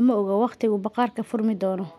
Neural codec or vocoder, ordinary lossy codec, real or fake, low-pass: none; none; real; 14.4 kHz